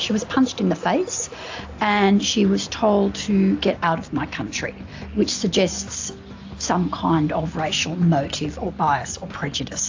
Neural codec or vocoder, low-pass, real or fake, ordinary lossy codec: none; 7.2 kHz; real; AAC, 48 kbps